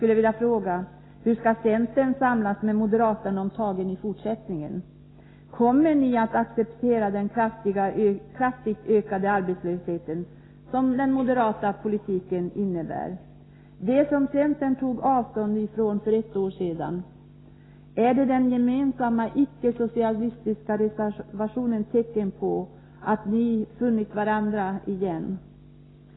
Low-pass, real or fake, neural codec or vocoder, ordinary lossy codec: 7.2 kHz; real; none; AAC, 16 kbps